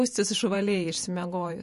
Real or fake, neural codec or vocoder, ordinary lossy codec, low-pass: fake; vocoder, 48 kHz, 128 mel bands, Vocos; MP3, 48 kbps; 14.4 kHz